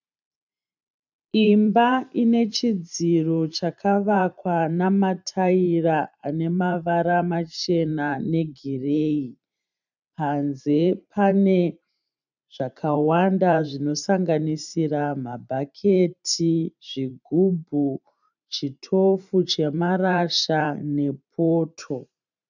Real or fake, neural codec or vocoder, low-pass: fake; vocoder, 44.1 kHz, 128 mel bands every 512 samples, BigVGAN v2; 7.2 kHz